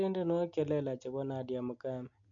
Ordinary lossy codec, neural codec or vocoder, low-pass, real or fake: MP3, 96 kbps; none; 7.2 kHz; real